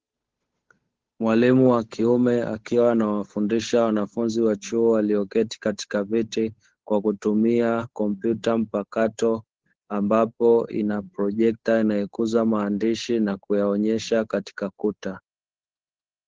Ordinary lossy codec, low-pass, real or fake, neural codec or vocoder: Opus, 16 kbps; 7.2 kHz; fake; codec, 16 kHz, 8 kbps, FunCodec, trained on Chinese and English, 25 frames a second